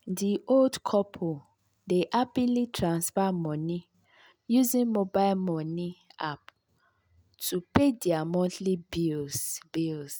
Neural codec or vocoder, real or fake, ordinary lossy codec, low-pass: none; real; none; none